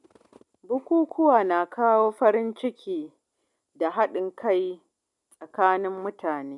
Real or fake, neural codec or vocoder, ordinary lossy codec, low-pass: real; none; none; 10.8 kHz